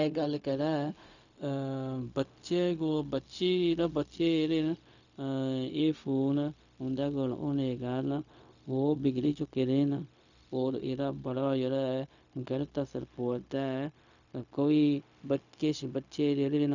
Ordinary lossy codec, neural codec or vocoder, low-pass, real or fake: none; codec, 16 kHz, 0.4 kbps, LongCat-Audio-Codec; 7.2 kHz; fake